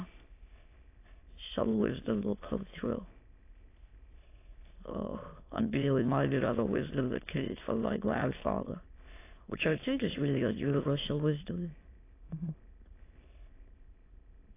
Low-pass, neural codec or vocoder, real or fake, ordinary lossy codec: 3.6 kHz; autoencoder, 22.05 kHz, a latent of 192 numbers a frame, VITS, trained on many speakers; fake; AAC, 24 kbps